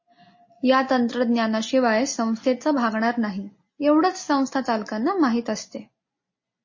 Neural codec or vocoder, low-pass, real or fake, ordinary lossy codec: none; 7.2 kHz; real; MP3, 32 kbps